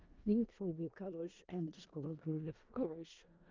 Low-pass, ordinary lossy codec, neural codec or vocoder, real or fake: 7.2 kHz; Opus, 24 kbps; codec, 16 kHz in and 24 kHz out, 0.4 kbps, LongCat-Audio-Codec, four codebook decoder; fake